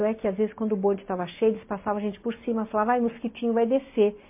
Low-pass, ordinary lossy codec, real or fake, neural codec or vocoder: 3.6 kHz; MP3, 24 kbps; real; none